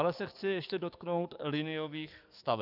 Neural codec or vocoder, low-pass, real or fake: codec, 44.1 kHz, 7.8 kbps, DAC; 5.4 kHz; fake